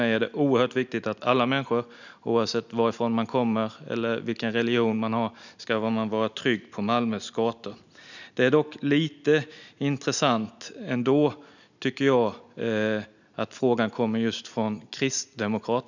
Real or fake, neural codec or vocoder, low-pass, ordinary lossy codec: real; none; 7.2 kHz; none